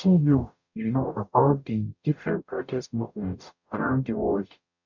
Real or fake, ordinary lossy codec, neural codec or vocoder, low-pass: fake; none; codec, 44.1 kHz, 0.9 kbps, DAC; 7.2 kHz